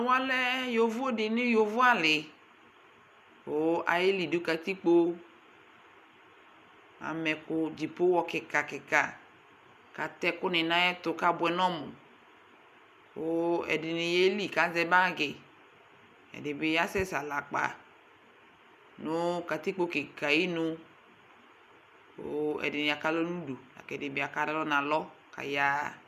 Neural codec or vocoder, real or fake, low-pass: none; real; 14.4 kHz